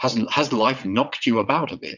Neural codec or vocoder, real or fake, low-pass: vocoder, 22.05 kHz, 80 mel bands, WaveNeXt; fake; 7.2 kHz